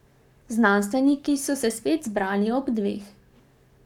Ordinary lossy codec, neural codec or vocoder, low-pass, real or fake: none; codec, 44.1 kHz, 7.8 kbps, Pupu-Codec; 19.8 kHz; fake